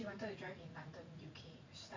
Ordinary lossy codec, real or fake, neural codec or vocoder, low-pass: MP3, 64 kbps; fake; vocoder, 44.1 kHz, 80 mel bands, Vocos; 7.2 kHz